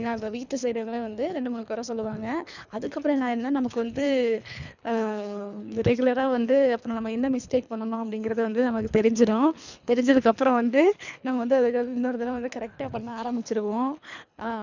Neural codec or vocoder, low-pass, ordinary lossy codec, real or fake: codec, 24 kHz, 3 kbps, HILCodec; 7.2 kHz; none; fake